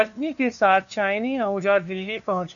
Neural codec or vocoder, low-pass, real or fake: codec, 16 kHz, 2 kbps, FunCodec, trained on LibriTTS, 25 frames a second; 7.2 kHz; fake